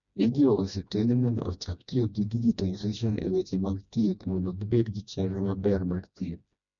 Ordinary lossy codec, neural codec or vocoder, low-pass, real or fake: none; codec, 16 kHz, 1 kbps, FreqCodec, smaller model; 7.2 kHz; fake